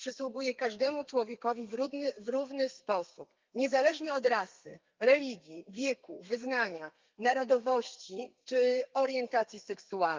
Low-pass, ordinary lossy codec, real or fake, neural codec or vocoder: 7.2 kHz; Opus, 24 kbps; fake; codec, 32 kHz, 1.9 kbps, SNAC